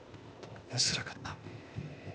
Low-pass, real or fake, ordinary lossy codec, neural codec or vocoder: none; fake; none; codec, 16 kHz, 0.8 kbps, ZipCodec